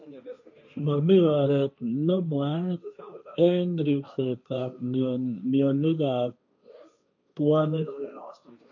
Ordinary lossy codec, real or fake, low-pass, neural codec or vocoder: none; fake; 7.2 kHz; codec, 16 kHz, 1.1 kbps, Voila-Tokenizer